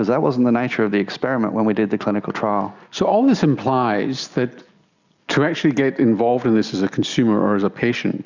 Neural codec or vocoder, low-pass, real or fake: none; 7.2 kHz; real